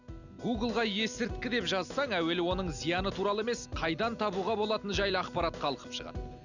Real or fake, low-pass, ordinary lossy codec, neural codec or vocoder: real; 7.2 kHz; none; none